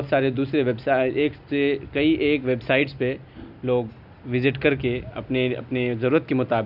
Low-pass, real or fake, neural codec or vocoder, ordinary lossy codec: 5.4 kHz; real; none; none